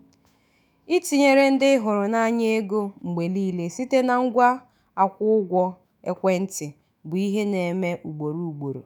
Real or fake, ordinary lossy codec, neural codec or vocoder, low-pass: fake; none; autoencoder, 48 kHz, 128 numbers a frame, DAC-VAE, trained on Japanese speech; none